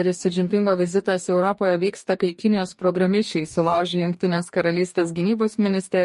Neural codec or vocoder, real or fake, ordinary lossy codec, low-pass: codec, 44.1 kHz, 2.6 kbps, DAC; fake; MP3, 48 kbps; 14.4 kHz